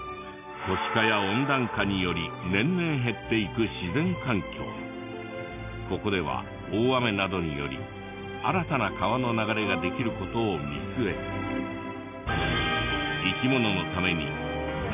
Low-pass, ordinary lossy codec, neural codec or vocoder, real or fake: 3.6 kHz; none; none; real